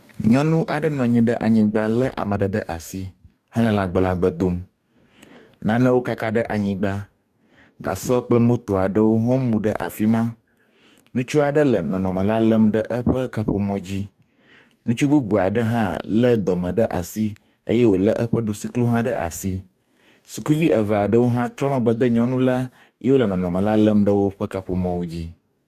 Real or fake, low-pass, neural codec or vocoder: fake; 14.4 kHz; codec, 44.1 kHz, 2.6 kbps, DAC